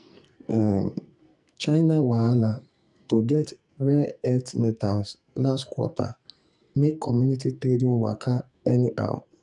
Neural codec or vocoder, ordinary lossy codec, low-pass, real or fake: codec, 44.1 kHz, 2.6 kbps, SNAC; none; 10.8 kHz; fake